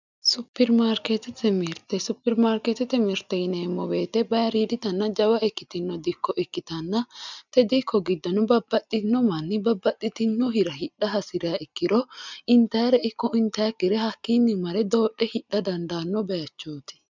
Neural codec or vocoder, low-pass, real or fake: none; 7.2 kHz; real